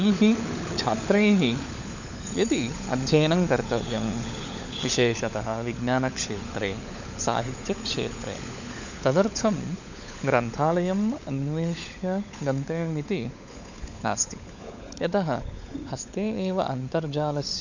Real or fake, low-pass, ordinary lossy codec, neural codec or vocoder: fake; 7.2 kHz; none; codec, 16 kHz, 16 kbps, FunCodec, trained on LibriTTS, 50 frames a second